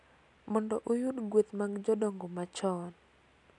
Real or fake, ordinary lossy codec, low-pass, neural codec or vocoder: real; none; 10.8 kHz; none